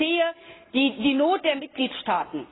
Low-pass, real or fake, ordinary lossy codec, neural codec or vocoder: 7.2 kHz; real; AAC, 16 kbps; none